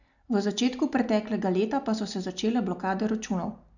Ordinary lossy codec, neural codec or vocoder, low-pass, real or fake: none; none; 7.2 kHz; real